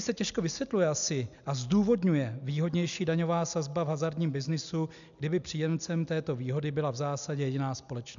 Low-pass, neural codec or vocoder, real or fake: 7.2 kHz; none; real